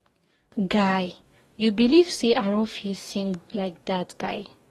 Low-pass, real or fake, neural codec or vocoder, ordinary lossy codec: 19.8 kHz; fake; codec, 44.1 kHz, 2.6 kbps, DAC; AAC, 32 kbps